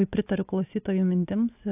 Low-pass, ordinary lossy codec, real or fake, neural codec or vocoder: 3.6 kHz; AAC, 24 kbps; real; none